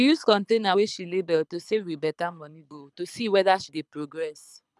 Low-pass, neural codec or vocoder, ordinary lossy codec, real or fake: none; codec, 24 kHz, 6 kbps, HILCodec; none; fake